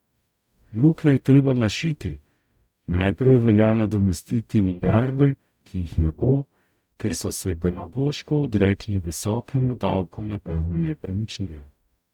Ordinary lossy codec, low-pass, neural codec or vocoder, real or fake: none; 19.8 kHz; codec, 44.1 kHz, 0.9 kbps, DAC; fake